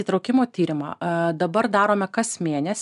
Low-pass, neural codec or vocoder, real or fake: 10.8 kHz; none; real